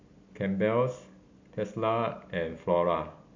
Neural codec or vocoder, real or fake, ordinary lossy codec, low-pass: none; real; MP3, 48 kbps; 7.2 kHz